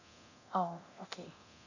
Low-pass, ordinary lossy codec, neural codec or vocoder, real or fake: 7.2 kHz; none; codec, 24 kHz, 0.9 kbps, DualCodec; fake